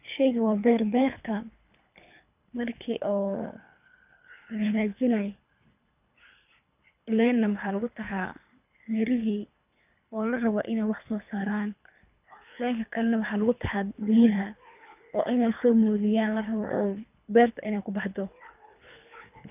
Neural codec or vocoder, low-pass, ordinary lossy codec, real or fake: codec, 24 kHz, 3 kbps, HILCodec; 3.6 kHz; none; fake